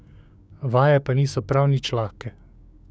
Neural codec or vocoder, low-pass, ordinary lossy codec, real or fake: codec, 16 kHz, 6 kbps, DAC; none; none; fake